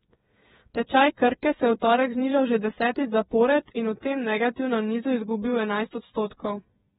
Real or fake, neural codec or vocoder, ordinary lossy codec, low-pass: fake; codec, 16 kHz, 8 kbps, FreqCodec, smaller model; AAC, 16 kbps; 7.2 kHz